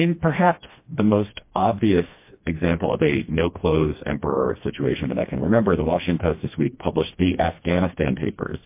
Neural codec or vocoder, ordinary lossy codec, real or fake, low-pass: codec, 16 kHz, 2 kbps, FreqCodec, smaller model; MP3, 24 kbps; fake; 3.6 kHz